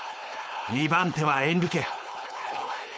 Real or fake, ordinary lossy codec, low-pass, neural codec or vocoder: fake; none; none; codec, 16 kHz, 4.8 kbps, FACodec